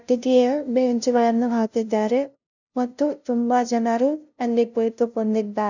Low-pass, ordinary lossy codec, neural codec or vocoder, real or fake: 7.2 kHz; none; codec, 16 kHz, 0.5 kbps, FunCodec, trained on LibriTTS, 25 frames a second; fake